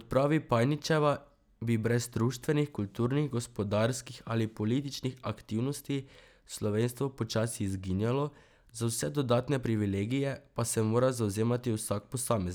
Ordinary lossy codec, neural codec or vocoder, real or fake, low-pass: none; none; real; none